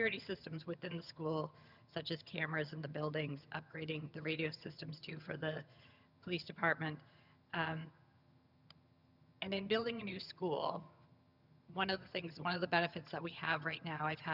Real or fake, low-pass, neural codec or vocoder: fake; 5.4 kHz; vocoder, 22.05 kHz, 80 mel bands, HiFi-GAN